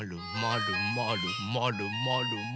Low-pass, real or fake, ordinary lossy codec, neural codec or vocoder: none; real; none; none